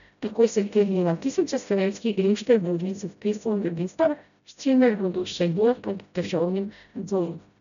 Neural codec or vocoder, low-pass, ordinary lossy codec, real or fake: codec, 16 kHz, 0.5 kbps, FreqCodec, smaller model; 7.2 kHz; none; fake